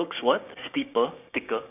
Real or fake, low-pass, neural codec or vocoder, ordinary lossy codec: fake; 3.6 kHz; codec, 16 kHz, 6 kbps, DAC; none